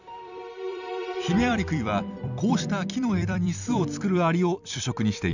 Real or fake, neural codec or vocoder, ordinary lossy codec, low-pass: fake; vocoder, 44.1 kHz, 128 mel bands every 256 samples, BigVGAN v2; none; 7.2 kHz